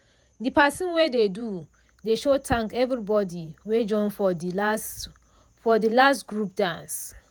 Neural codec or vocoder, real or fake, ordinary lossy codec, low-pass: vocoder, 48 kHz, 128 mel bands, Vocos; fake; none; none